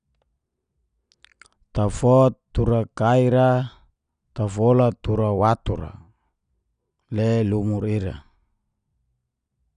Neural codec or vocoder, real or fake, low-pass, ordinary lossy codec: none; real; 9.9 kHz; none